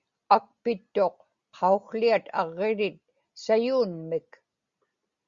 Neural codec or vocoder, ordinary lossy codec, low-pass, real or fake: none; Opus, 64 kbps; 7.2 kHz; real